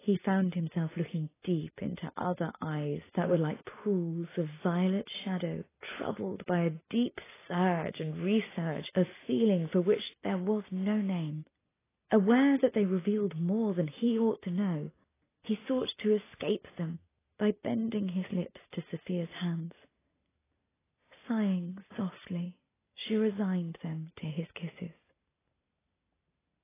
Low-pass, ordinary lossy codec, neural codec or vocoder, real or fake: 3.6 kHz; AAC, 16 kbps; none; real